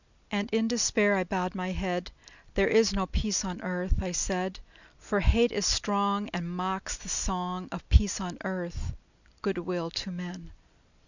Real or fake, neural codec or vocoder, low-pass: real; none; 7.2 kHz